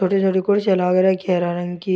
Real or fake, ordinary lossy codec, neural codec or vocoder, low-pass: real; none; none; none